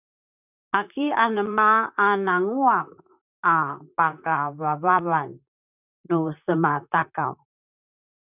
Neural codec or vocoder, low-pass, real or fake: vocoder, 44.1 kHz, 128 mel bands, Pupu-Vocoder; 3.6 kHz; fake